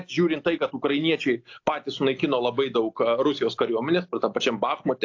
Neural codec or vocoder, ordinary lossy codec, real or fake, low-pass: none; AAC, 48 kbps; real; 7.2 kHz